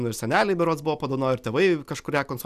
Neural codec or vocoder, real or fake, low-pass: none; real; 14.4 kHz